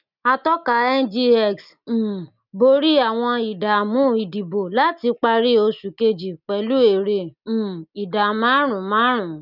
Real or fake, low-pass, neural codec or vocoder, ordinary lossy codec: real; 5.4 kHz; none; none